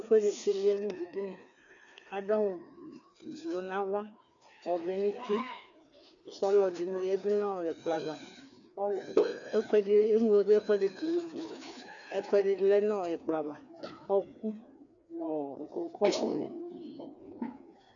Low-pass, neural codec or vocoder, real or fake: 7.2 kHz; codec, 16 kHz, 2 kbps, FreqCodec, larger model; fake